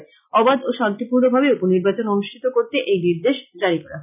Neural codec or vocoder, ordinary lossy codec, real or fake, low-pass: none; none; real; 3.6 kHz